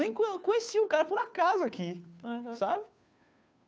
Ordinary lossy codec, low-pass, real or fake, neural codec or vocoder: none; none; fake; codec, 16 kHz, 2 kbps, FunCodec, trained on Chinese and English, 25 frames a second